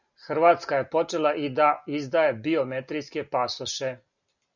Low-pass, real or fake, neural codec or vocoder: 7.2 kHz; real; none